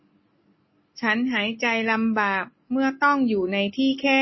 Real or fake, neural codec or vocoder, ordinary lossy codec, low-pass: real; none; MP3, 24 kbps; 7.2 kHz